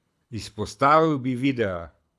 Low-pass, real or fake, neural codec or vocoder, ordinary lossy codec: none; fake; codec, 24 kHz, 6 kbps, HILCodec; none